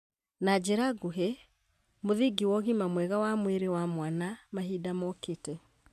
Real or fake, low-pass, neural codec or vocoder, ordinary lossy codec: real; 14.4 kHz; none; none